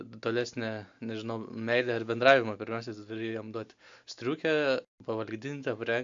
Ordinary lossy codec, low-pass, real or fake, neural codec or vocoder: AAC, 64 kbps; 7.2 kHz; real; none